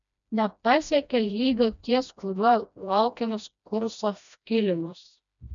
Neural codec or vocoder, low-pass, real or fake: codec, 16 kHz, 1 kbps, FreqCodec, smaller model; 7.2 kHz; fake